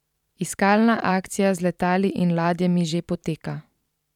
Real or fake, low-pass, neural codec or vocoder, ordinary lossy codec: fake; 19.8 kHz; vocoder, 44.1 kHz, 128 mel bands every 512 samples, BigVGAN v2; none